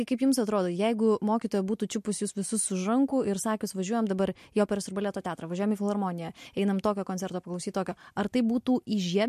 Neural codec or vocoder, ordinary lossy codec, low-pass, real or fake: none; MP3, 64 kbps; 14.4 kHz; real